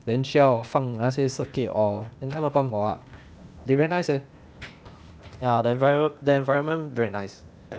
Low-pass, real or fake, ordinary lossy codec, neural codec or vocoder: none; fake; none; codec, 16 kHz, 0.8 kbps, ZipCodec